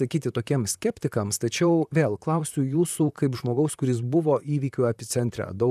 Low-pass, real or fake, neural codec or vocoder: 14.4 kHz; fake; vocoder, 44.1 kHz, 128 mel bands, Pupu-Vocoder